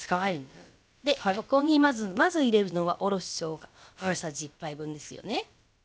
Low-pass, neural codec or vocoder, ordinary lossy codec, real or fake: none; codec, 16 kHz, about 1 kbps, DyCAST, with the encoder's durations; none; fake